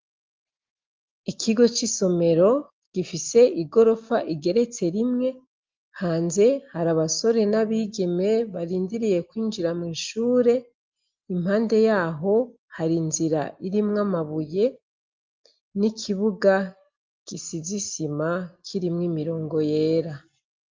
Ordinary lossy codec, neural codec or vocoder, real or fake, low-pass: Opus, 32 kbps; none; real; 7.2 kHz